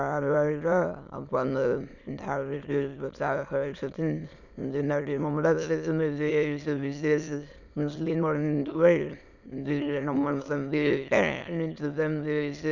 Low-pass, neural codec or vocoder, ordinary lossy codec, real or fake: 7.2 kHz; autoencoder, 22.05 kHz, a latent of 192 numbers a frame, VITS, trained on many speakers; none; fake